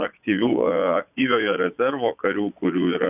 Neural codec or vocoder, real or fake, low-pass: vocoder, 22.05 kHz, 80 mel bands, Vocos; fake; 3.6 kHz